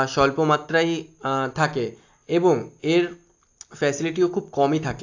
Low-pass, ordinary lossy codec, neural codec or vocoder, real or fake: 7.2 kHz; AAC, 48 kbps; none; real